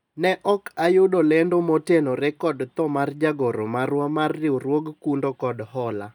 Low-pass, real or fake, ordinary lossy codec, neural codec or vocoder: 19.8 kHz; real; none; none